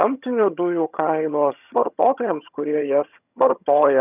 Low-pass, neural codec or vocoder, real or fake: 3.6 kHz; vocoder, 22.05 kHz, 80 mel bands, HiFi-GAN; fake